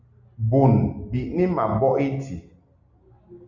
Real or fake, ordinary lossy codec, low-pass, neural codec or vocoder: real; Opus, 64 kbps; 7.2 kHz; none